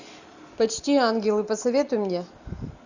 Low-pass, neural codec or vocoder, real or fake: 7.2 kHz; none; real